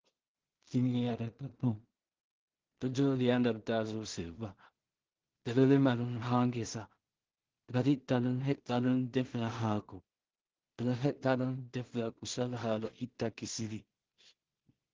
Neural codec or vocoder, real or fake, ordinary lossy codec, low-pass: codec, 16 kHz in and 24 kHz out, 0.4 kbps, LongCat-Audio-Codec, two codebook decoder; fake; Opus, 16 kbps; 7.2 kHz